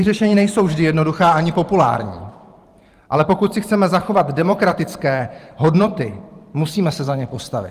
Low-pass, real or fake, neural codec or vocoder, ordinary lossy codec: 14.4 kHz; fake; vocoder, 44.1 kHz, 128 mel bands every 512 samples, BigVGAN v2; Opus, 32 kbps